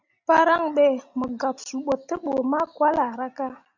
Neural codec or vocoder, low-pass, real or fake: none; 7.2 kHz; real